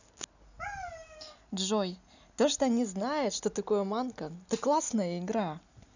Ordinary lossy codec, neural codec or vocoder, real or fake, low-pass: none; none; real; 7.2 kHz